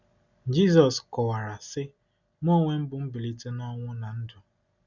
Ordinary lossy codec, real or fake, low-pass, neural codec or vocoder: none; real; 7.2 kHz; none